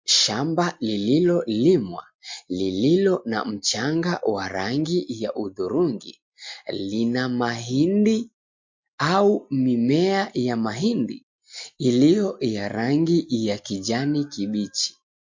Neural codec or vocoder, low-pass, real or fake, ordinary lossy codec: none; 7.2 kHz; real; MP3, 48 kbps